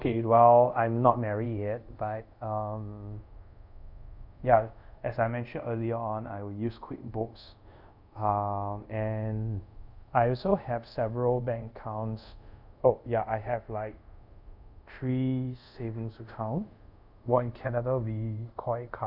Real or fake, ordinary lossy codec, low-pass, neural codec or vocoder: fake; none; 5.4 kHz; codec, 24 kHz, 0.5 kbps, DualCodec